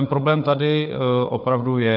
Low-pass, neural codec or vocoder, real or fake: 5.4 kHz; codec, 16 kHz, 4 kbps, FunCodec, trained on Chinese and English, 50 frames a second; fake